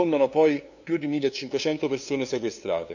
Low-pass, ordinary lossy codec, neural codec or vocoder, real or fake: 7.2 kHz; none; codec, 16 kHz, 2 kbps, FunCodec, trained on LibriTTS, 25 frames a second; fake